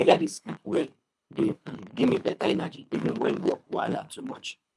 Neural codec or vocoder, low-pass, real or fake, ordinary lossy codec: codec, 24 kHz, 3 kbps, HILCodec; none; fake; none